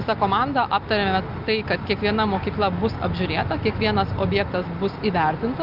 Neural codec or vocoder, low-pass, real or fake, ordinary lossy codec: none; 5.4 kHz; real; Opus, 24 kbps